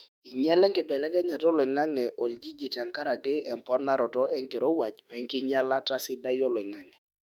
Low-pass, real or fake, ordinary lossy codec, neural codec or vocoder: 19.8 kHz; fake; none; autoencoder, 48 kHz, 32 numbers a frame, DAC-VAE, trained on Japanese speech